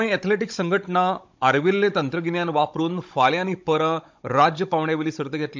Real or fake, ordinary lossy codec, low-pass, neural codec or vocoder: fake; MP3, 64 kbps; 7.2 kHz; codec, 16 kHz, 16 kbps, FunCodec, trained on Chinese and English, 50 frames a second